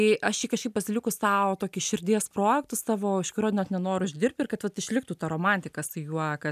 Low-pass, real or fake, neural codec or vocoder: 14.4 kHz; real; none